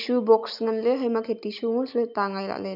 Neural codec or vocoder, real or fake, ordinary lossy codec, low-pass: none; real; none; 5.4 kHz